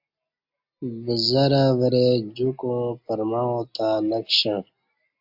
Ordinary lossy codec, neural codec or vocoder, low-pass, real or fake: AAC, 48 kbps; none; 5.4 kHz; real